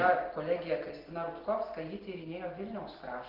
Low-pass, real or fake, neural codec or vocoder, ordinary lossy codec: 5.4 kHz; real; none; Opus, 16 kbps